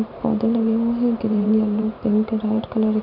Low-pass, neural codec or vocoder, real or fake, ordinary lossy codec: 5.4 kHz; vocoder, 44.1 kHz, 128 mel bands every 512 samples, BigVGAN v2; fake; none